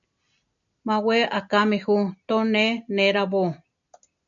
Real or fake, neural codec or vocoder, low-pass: real; none; 7.2 kHz